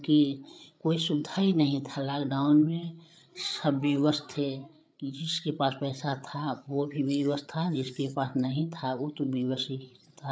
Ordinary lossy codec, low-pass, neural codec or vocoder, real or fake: none; none; codec, 16 kHz, 8 kbps, FreqCodec, larger model; fake